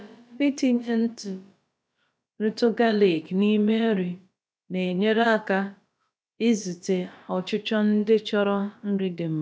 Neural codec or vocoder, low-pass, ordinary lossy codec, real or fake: codec, 16 kHz, about 1 kbps, DyCAST, with the encoder's durations; none; none; fake